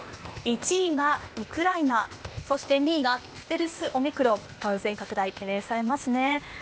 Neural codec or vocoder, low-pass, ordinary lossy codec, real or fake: codec, 16 kHz, 0.8 kbps, ZipCodec; none; none; fake